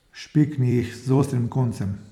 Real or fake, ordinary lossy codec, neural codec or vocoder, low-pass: fake; none; vocoder, 44.1 kHz, 128 mel bands every 256 samples, BigVGAN v2; 19.8 kHz